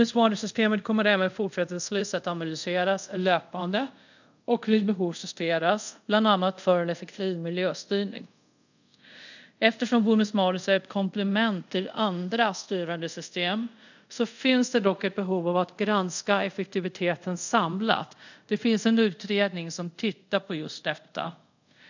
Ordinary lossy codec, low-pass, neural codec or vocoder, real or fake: none; 7.2 kHz; codec, 24 kHz, 0.5 kbps, DualCodec; fake